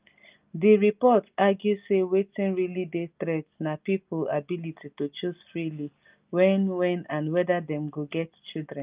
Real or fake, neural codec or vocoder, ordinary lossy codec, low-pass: real; none; Opus, 24 kbps; 3.6 kHz